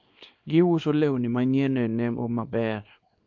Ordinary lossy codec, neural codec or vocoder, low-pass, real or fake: MP3, 48 kbps; codec, 24 kHz, 0.9 kbps, WavTokenizer, small release; 7.2 kHz; fake